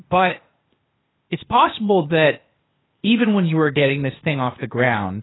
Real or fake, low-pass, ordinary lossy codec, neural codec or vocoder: fake; 7.2 kHz; AAC, 16 kbps; codec, 16 kHz, 0.8 kbps, ZipCodec